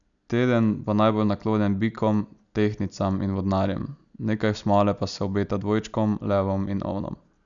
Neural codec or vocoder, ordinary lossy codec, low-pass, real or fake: none; none; 7.2 kHz; real